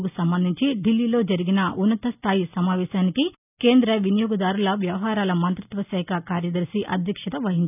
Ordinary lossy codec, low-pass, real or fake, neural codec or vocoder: none; 3.6 kHz; real; none